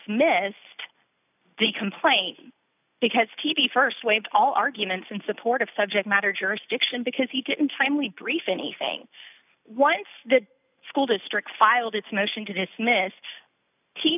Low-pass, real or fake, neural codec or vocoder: 3.6 kHz; real; none